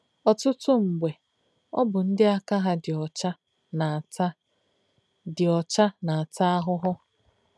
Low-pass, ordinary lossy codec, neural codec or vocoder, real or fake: none; none; none; real